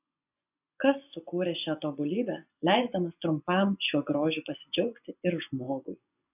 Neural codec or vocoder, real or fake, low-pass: vocoder, 24 kHz, 100 mel bands, Vocos; fake; 3.6 kHz